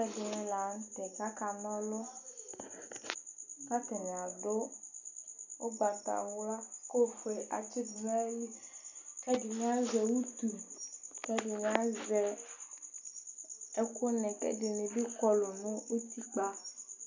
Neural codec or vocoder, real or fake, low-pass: none; real; 7.2 kHz